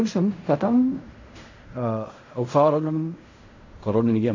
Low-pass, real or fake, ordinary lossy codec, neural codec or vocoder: 7.2 kHz; fake; AAC, 48 kbps; codec, 16 kHz in and 24 kHz out, 0.4 kbps, LongCat-Audio-Codec, fine tuned four codebook decoder